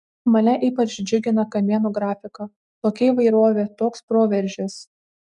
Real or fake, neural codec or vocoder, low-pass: real; none; 10.8 kHz